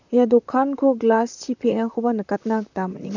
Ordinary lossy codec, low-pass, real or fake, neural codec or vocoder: none; 7.2 kHz; fake; vocoder, 44.1 kHz, 128 mel bands, Pupu-Vocoder